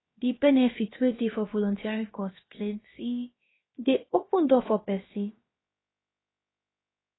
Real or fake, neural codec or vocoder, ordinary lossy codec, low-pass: fake; codec, 16 kHz, about 1 kbps, DyCAST, with the encoder's durations; AAC, 16 kbps; 7.2 kHz